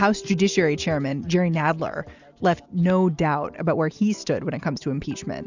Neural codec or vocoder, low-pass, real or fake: none; 7.2 kHz; real